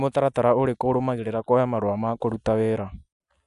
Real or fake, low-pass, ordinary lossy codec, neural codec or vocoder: real; 10.8 kHz; none; none